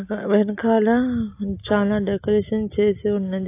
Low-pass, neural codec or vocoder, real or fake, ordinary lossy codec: 3.6 kHz; none; real; AAC, 24 kbps